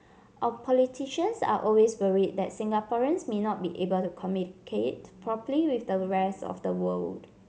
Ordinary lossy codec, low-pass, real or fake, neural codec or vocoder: none; none; real; none